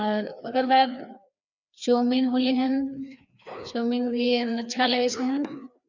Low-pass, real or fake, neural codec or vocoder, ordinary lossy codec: 7.2 kHz; fake; codec, 16 kHz, 2 kbps, FreqCodec, larger model; none